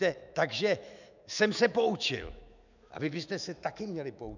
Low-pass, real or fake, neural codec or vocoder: 7.2 kHz; fake; autoencoder, 48 kHz, 128 numbers a frame, DAC-VAE, trained on Japanese speech